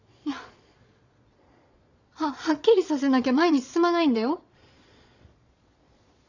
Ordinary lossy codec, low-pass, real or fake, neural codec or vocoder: none; 7.2 kHz; fake; vocoder, 22.05 kHz, 80 mel bands, Vocos